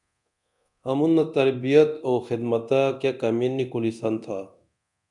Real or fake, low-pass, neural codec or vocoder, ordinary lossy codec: fake; 10.8 kHz; codec, 24 kHz, 0.9 kbps, DualCodec; MP3, 96 kbps